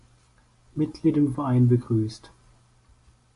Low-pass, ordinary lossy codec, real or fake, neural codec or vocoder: 10.8 kHz; AAC, 64 kbps; real; none